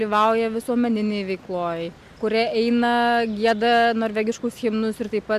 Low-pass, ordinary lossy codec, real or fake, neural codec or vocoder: 14.4 kHz; AAC, 96 kbps; real; none